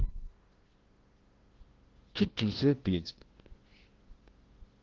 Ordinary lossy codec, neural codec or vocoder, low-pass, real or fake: Opus, 16 kbps; codec, 16 kHz, 0.5 kbps, FunCodec, trained on LibriTTS, 25 frames a second; 7.2 kHz; fake